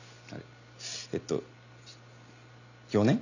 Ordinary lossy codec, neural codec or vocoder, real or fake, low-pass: none; none; real; 7.2 kHz